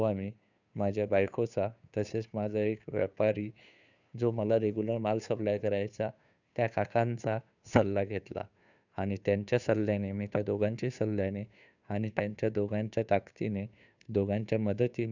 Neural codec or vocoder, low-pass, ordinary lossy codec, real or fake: codec, 16 kHz, 2 kbps, FunCodec, trained on Chinese and English, 25 frames a second; 7.2 kHz; none; fake